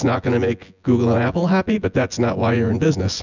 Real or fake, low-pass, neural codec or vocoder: fake; 7.2 kHz; vocoder, 24 kHz, 100 mel bands, Vocos